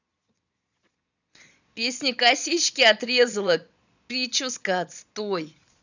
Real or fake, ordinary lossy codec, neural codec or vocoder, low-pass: fake; none; vocoder, 22.05 kHz, 80 mel bands, Vocos; 7.2 kHz